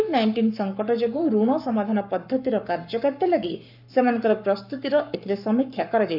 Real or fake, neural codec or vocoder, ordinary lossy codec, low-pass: fake; codec, 44.1 kHz, 7.8 kbps, Pupu-Codec; none; 5.4 kHz